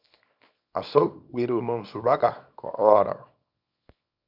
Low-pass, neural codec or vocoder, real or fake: 5.4 kHz; codec, 24 kHz, 0.9 kbps, WavTokenizer, small release; fake